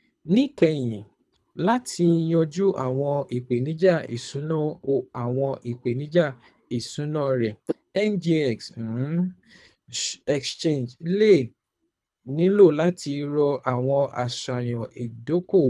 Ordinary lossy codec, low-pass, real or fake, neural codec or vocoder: none; none; fake; codec, 24 kHz, 3 kbps, HILCodec